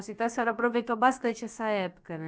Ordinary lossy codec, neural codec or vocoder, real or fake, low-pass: none; codec, 16 kHz, about 1 kbps, DyCAST, with the encoder's durations; fake; none